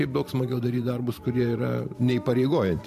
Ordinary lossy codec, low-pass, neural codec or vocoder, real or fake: MP3, 64 kbps; 14.4 kHz; vocoder, 44.1 kHz, 128 mel bands every 512 samples, BigVGAN v2; fake